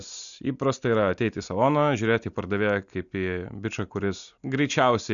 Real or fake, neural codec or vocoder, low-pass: real; none; 7.2 kHz